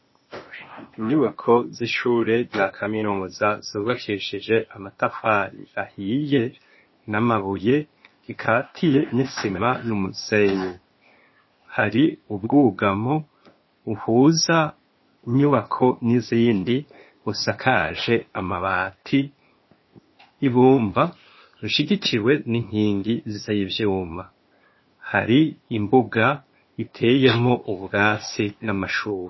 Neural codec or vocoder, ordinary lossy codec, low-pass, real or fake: codec, 16 kHz, 0.8 kbps, ZipCodec; MP3, 24 kbps; 7.2 kHz; fake